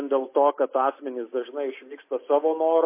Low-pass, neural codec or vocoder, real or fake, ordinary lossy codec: 3.6 kHz; none; real; MP3, 24 kbps